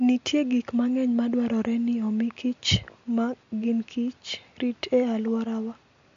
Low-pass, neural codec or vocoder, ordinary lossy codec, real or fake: 7.2 kHz; none; MP3, 48 kbps; real